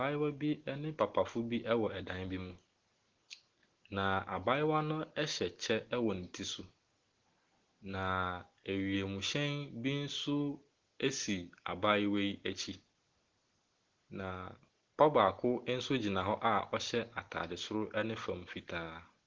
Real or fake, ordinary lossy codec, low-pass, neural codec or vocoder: real; Opus, 16 kbps; 7.2 kHz; none